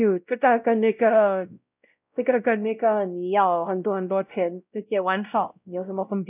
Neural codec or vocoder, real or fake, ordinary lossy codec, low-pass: codec, 16 kHz, 0.5 kbps, X-Codec, WavLM features, trained on Multilingual LibriSpeech; fake; none; 3.6 kHz